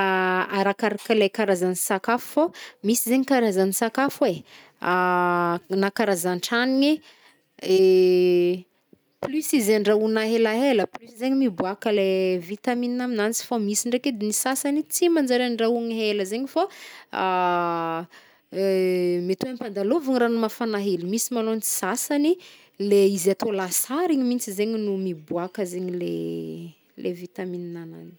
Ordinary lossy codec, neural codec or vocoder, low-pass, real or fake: none; none; none; real